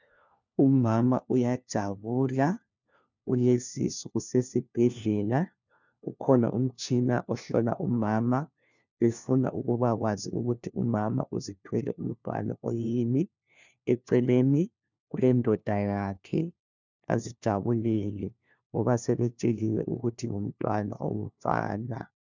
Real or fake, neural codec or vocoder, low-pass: fake; codec, 16 kHz, 1 kbps, FunCodec, trained on LibriTTS, 50 frames a second; 7.2 kHz